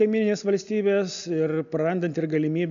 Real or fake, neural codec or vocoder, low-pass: real; none; 7.2 kHz